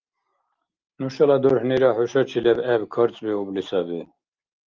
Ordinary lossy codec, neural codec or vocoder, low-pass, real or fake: Opus, 32 kbps; none; 7.2 kHz; real